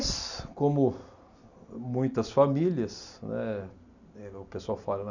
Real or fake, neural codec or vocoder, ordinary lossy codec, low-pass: real; none; none; 7.2 kHz